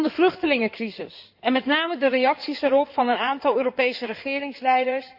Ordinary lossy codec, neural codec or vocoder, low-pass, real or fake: Opus, 64 kbps; codec, 16 kHz in and 24 kHz out, 2.2 kbps, FireRedTTS-2 codec; 5.4 kHz; fake